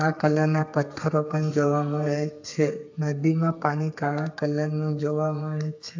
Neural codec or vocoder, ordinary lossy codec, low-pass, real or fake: codec, 32 kHz, 1.9 kbps, SNAC; AAC, 48 kbps; 7.2 kHz; fake